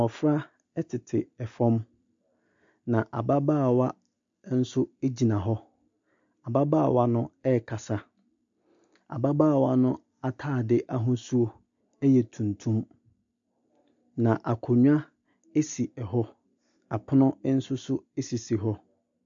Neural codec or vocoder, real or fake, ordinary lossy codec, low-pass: none; real; MP3, 64 kbps; 7.2 kHz